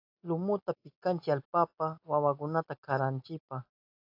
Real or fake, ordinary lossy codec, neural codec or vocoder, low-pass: real; AAC, 32 kbps; none; 5.4 kHz